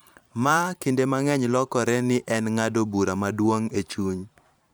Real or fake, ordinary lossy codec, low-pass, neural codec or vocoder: fake; none; none; vocoder, 44.1 kHz, 128 mel bands every 512 samples, BigVGAN v2